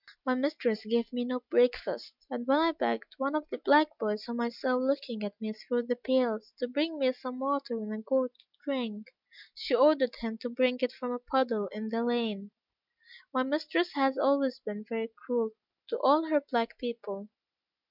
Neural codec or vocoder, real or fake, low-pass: none; real; 5.4 kHz